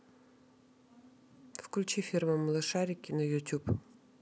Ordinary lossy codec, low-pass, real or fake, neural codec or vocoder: none; none; real; none